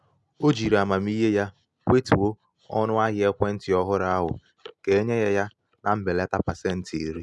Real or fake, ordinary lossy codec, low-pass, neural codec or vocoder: real; none; none; none